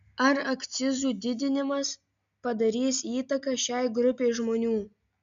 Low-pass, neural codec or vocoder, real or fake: 7.2 kHz; none; real